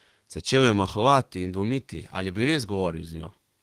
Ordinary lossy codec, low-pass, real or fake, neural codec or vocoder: Opus, 24 kbps; 14.4 kHz; fake; codec, 32 kHz, 1.9 kbps, SNAC